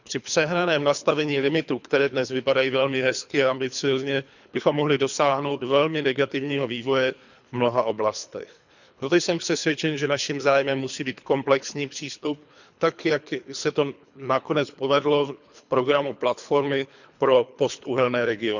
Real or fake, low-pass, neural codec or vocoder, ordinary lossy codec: fake; 7.2 kHz; codec, 24 kHz, 3 kbps, HILCodec; none